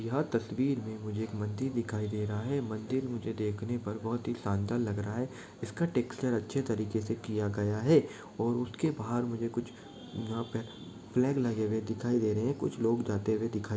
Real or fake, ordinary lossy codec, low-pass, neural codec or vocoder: real; none; none; none